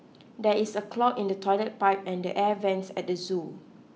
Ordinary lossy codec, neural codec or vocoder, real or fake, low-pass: none; none; real; none